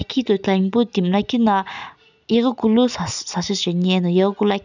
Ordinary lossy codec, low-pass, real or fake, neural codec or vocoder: none; 7.2 kHz; real; none